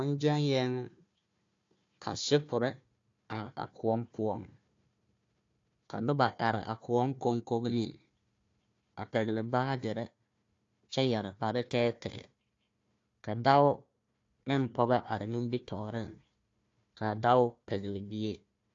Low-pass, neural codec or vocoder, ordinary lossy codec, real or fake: 7.2 kHz; codec, 16 kHz, 1 kbps, FunCodec, trained on Chinese and English, 50 frames a second; AAC, 48 kbps; fake